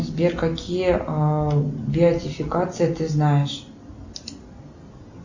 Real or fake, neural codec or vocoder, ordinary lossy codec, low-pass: real; none; Opus, 64 kbps; 7.2 kHz